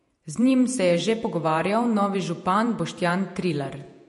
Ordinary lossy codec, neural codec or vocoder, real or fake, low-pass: MP3, 48 kbps; vocoder, 48 kHz, 128 mel bands, Vocos; fake; 14.4 kHz